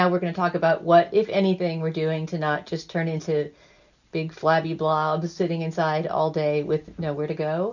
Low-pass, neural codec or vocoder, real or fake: 7.2 kHz; none; real